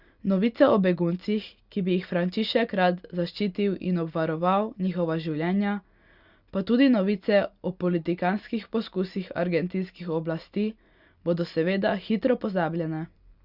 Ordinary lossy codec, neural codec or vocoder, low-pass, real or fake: Opus, 64 kbps; none; 5.4 kHz; real